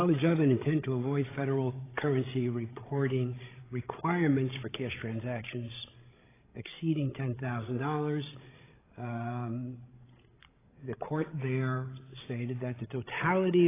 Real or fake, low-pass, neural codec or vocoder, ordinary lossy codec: fake; 3.6 kHz; codec, 16 kHz, 16 kbps, FreqCodec, larger model; AAC, 16 kbps